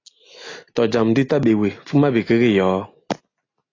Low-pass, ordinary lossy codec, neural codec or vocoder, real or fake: 7.2 kHz; AAC, 32 kbps; none; real